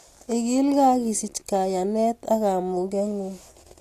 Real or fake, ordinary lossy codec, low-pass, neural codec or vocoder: real; AAC, 64 kbps; 14.4 kHz; none